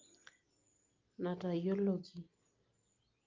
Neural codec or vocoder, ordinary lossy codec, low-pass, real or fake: none; Opus, 32 kbps; 7.2 kHz; real